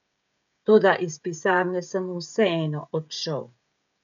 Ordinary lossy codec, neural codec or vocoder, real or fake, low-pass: none; codec, 16 kHz, 16 kbps, FreqCodec, smaller model; fake; 7.2 kHz